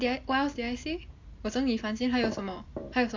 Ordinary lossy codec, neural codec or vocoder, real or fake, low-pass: AAC, 48 kbps; none; real; 7.2 kHz